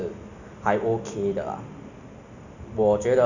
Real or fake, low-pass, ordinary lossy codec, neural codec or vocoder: real; 7.2 kHz; none; none